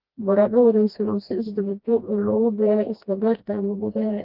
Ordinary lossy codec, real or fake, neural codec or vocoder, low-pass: Opus, 24 kbps; fake; codec, 16 kHz, 1 kbps, FreqCodec, smaller model; 5.4 kHz